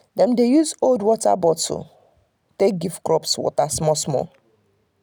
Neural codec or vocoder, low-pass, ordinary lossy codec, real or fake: none; none; none; real